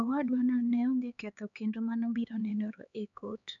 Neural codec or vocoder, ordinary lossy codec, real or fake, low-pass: codec, 16 kHz, 4 kbps, X-Codec, HuBERT features, trained on LibriSpeech; none; fake; 7.2 kHz